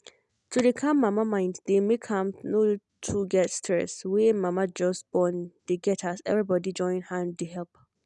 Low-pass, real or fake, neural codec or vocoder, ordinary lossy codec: 10.8 kHz; real; none; none